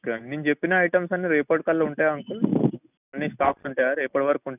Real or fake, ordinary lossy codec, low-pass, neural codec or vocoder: real; none; 3.6 kHz; none